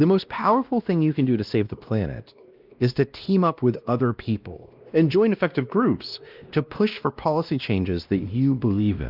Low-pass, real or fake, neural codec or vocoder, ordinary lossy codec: 5.4 kHz; fake; codec, 16 kHz, 1 kbps, X-Codec, WavLM features, trained on Multilingual LibriSpeech; Opus, 24 kbps